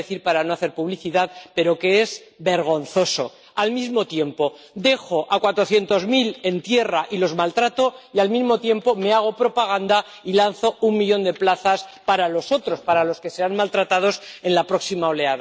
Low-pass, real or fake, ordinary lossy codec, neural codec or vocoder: none; real; none; none